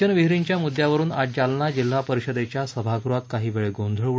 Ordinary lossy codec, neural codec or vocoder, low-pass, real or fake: none; none; none; real